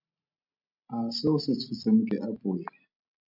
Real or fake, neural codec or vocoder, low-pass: real; none; 5.4 kHz